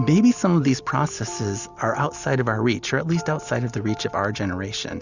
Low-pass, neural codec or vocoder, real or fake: 7.2 kHz; none; real